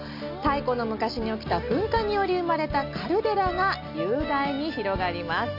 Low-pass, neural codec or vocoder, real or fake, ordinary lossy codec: 5.4 kHz; none; real; none